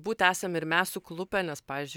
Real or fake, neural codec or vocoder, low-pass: real; none; 19.8 kHz